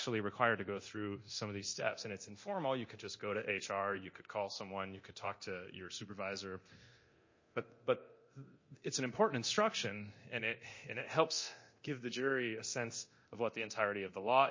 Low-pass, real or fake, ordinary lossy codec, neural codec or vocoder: 7.2 kHz; fake; MP3, 32 kbps; codec, 24 kHz, 0.9 kbps, DualCodec